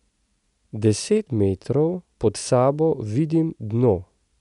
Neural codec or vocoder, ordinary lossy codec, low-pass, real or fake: none; none; 10.8 kHz; real